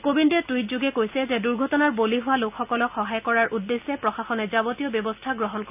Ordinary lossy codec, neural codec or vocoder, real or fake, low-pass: none; none; real; 3.6 kHz